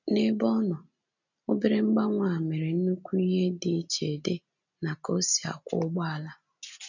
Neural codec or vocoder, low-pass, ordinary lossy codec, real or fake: none; 7.2 kHz; none; real